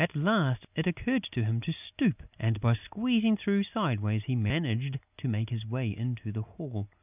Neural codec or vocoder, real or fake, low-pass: none; real; 3.6 kHz